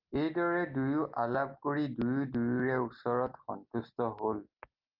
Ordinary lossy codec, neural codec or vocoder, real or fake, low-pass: Opus, 24 kbps; none; real; 5.4 kHz